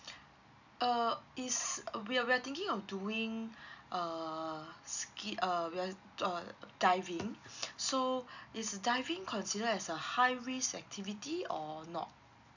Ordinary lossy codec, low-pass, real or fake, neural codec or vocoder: none; 7.2 kHz; real; none